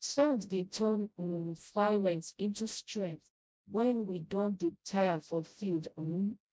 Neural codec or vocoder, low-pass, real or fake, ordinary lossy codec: codec, 16 kHz, 0.5 kbps, FreqCodec, smaller model; none; fake; none